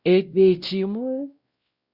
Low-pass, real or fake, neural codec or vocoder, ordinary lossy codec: 5.4 kHz; fake; codec, 16 kHz, 0.5 kbps, X-Codec, WavLM features, trained on Multilingual LibriSpeech; Opus, 64 kbps